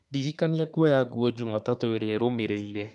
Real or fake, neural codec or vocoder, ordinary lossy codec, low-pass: fake; codec, 24 kHz, 1 kbps, SNAC; none; 10.8 kHz